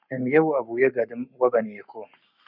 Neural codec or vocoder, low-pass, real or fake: codec, 44.1 kHz, 7.8 kbps, Pupu-Codec; 5.4 kHz; fake